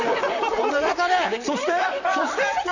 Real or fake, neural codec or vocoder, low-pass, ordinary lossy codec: fake; vocoder, 44.1 kHz, 128 mel bands, Pupu-Vocoder; 7.2 kHz; none